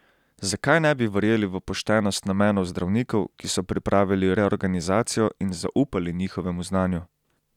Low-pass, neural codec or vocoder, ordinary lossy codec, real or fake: 19.8 kHz; none; none; real